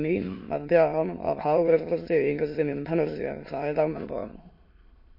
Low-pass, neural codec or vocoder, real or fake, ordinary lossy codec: 5.4 kHz; autoencoder, 22.05 kHz, a latent of 192 numbers a frame, VITS, trained on many speakers; fake; MP3, 32 kbps